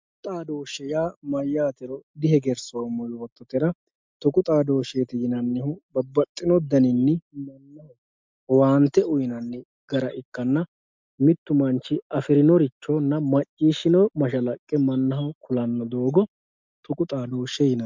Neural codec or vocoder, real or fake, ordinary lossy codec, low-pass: none; real; MP3, 64 kbps; 7.2 kHz